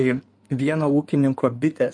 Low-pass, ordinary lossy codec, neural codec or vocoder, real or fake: 9.9 kHz; MP3, 48 kbps; codec, 16 kHz in and 24 kHz out, 2.2 kbps, FireRedTTS-2 codec; fake